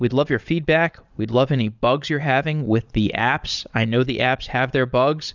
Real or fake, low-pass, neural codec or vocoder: fake; 7.2 kHz; vocoder, 22.05 kHz, 80 mel bands, Vocos